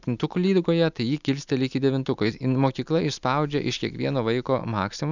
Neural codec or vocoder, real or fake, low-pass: none; real; 7.2 kHz